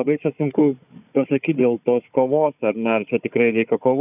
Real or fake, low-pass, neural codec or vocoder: fake; 3.6 kHz; codec, 16 kHz, 4 kbps, FunCodec, trained on Chinese and English, 50 frames a second